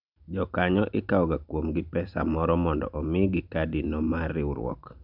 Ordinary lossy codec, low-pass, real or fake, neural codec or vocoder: none; 5.4 kHz; real; none